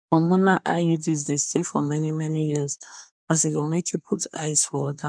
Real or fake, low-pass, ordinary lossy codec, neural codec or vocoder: fake; 9.9 kHz; none; codec, 24 kHz, 1 kbps, SNAC